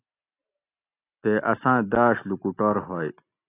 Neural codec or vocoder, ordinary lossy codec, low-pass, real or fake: none; AAC, 24 kbps; 3.6 kHz; real